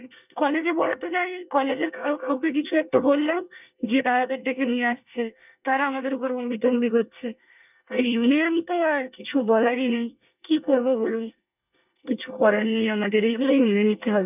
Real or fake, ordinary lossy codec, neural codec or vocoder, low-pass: fake; none; codec, 24 kHz, 1 kbps, SNAC; 3.6 kHz